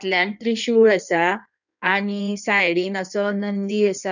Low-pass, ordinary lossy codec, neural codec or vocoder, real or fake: 7.2 kHz; none; codec, 16 kHz in and 24 kHz out, 1.1 kbps, FireRedTTS-2 codec; fake